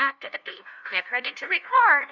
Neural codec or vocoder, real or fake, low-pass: codec, 16 kHz, 0.5 kbps, FunCodec, trained on LibriTTS, 25 frames a second; fake; 7.2 kHz